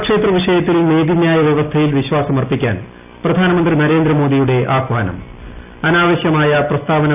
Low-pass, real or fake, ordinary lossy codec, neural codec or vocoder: 3.6 kHz; real; none; none